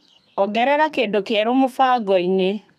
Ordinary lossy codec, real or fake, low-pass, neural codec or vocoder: none; fake; 14.4 kHz; codec, 32 kHz, 1.9 kbps, SNAC